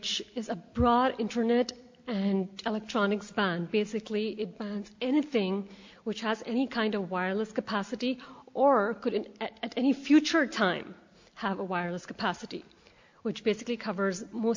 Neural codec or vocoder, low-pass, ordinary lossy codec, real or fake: none; 7.2 kHz; MP3, 48 kbps; real